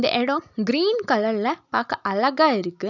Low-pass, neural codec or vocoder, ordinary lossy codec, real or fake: 7.2 kHz; none; none; real